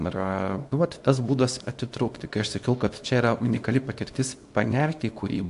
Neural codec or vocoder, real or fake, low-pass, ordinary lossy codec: codec, 24 kHz, 0.9 kbps, WavTokenizer, small release; fake; 10.8 kHz; AAC, 64 kbps